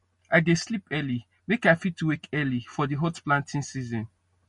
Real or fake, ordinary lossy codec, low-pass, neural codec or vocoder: real; MP3, 48 kbps; 10.8 kHz; none